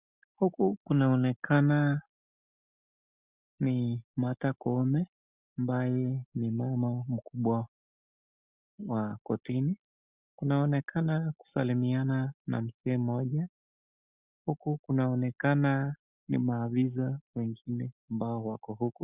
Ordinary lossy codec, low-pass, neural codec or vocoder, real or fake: Opus, 64 kbps; 3.6 kHz; none; real